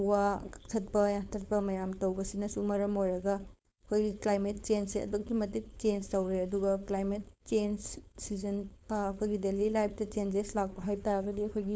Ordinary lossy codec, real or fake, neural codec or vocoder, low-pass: none; fake; codec, 16 kHz, 4.8 kbps, FACodec; none